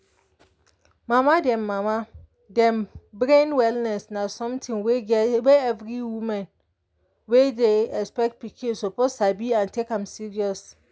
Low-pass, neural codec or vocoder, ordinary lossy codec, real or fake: none; none; none; real